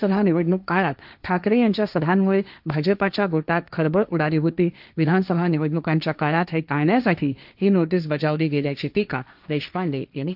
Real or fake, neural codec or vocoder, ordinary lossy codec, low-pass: fake; codec, 16 kHz, 1.1 kbps, Voila-Tokenizer; none; 5.4 kHz